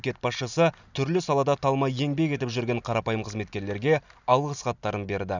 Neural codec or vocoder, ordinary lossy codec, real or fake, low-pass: none; none; real; 7.2 kHz